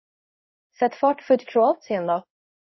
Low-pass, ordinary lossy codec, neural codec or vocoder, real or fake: 7.2 kHz; MP3, 24 kbps; codec, 16 kHz in and 24 kHz out, 1 kbps, XY-Tokenizer; fake